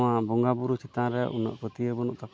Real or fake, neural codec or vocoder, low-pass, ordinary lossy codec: real; none; none; none